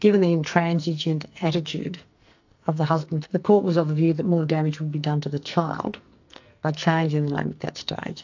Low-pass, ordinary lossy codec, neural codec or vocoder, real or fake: 7.2 kHz; AAC, 48 kbps; codec, 44.1 kHz, 2.6 kbps, SNAC; fake